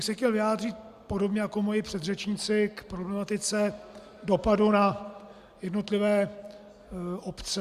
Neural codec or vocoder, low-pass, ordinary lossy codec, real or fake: none; 14.4 kHz; Opus, 64 kbps; real